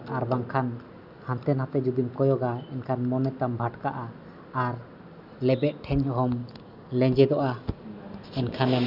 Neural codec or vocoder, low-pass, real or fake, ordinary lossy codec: none; 5.4 kHz; real; none